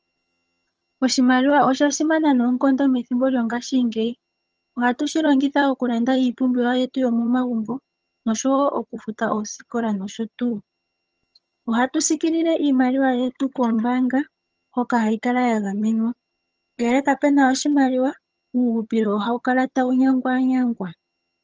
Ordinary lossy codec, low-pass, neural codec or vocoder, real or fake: Opus, 24 kbps; 7.2 kHz; vocoder, 22.05 kHz, 80 mel bands, HiFi-GAN; fake